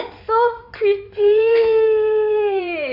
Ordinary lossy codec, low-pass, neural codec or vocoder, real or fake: none; 5.4 kHz; codec, 16 kHz in and 24 kHz out, 2.2 kbps, FireRedTTS-2 codec; fake